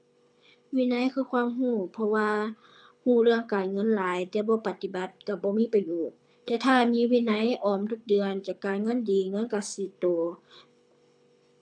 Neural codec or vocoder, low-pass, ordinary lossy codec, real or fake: codec, 24 kHz, 6 kbps, HILCodec; 9.9 kHz; none; fake